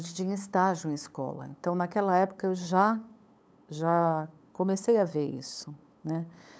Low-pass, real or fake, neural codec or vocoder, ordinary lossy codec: none; fake; codec, 16 kHz, 8 kbps, FunCodec, trained on LibriTTS, 25 frames a second; none